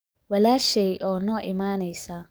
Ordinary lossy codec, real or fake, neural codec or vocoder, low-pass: none; fake; codec, 44.1 kHz, 7.8 kbps, DAC; none